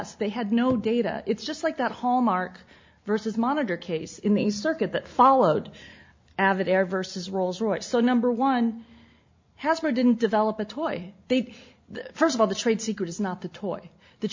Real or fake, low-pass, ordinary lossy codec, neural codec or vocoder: real; 7.2 kHz; MP3, 48 kbps; none